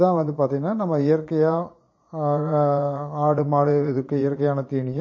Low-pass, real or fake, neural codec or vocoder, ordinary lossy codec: 7.2 kHz; fake; vocoder, 22.05 kHz, 80 mel bands, Vocos; MP3, 32 kbps